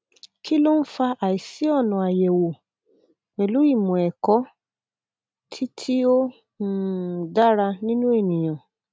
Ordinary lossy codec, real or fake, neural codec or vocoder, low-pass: none; real; none; none